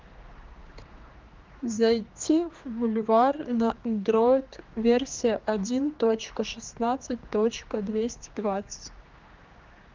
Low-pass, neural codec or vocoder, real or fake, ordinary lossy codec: 7.2 kHz; codec, 16 kHz, 2 kbps, X-Codec, HuBERT features, trained on general audio; fake; Opus, 24 kbps